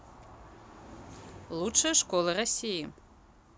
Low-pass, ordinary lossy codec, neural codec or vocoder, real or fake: none; none; none; real